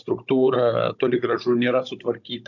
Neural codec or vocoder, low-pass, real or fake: codec, 16 kHz, 16 kbps, FunCodec, trained on Chinese and English, 50 frames a second; 7.2 kHz; fake